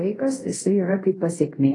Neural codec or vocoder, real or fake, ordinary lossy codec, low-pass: codec, 24 kHz, 0.5 kbps, DualCodec; fake; AAC, 32 kbps; 10.8 kHz